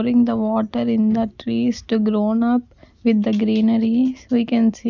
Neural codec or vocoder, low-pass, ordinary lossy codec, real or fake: none; 7.2 kHz; none; real